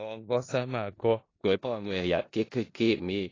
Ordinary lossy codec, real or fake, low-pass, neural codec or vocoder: AAC, 32 kbps; fake; 7.2 kHz; codec, 16 kHz in and 24 kHz out, 0.4 kbps, LongCat-Audio-Codec, four codebook decoder